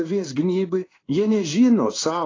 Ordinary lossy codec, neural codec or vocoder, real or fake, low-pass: AAC, 32 kbps; codec, 16 kHz in and 24 kHz out, 1 kbps, XY-Tokenizer; fake; 7.2 kHz